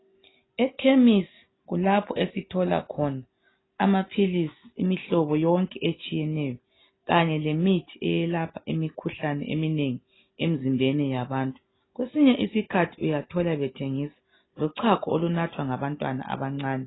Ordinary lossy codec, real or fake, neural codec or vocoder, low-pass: AAC, 16 kbps; real; none; 7.2 kHz